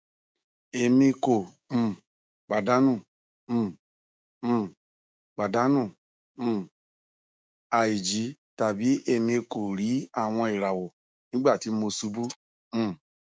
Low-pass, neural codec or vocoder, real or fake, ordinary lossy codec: none; codec, 16 kHz, 6 kbps, DAC; fake; none